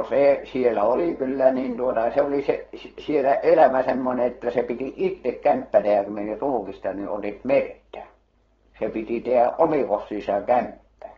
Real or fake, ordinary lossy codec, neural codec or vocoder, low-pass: fake; AAC, 24 kbps; codec, 16 kHz, 4.8 kbps, FACodec; 7.2 kHz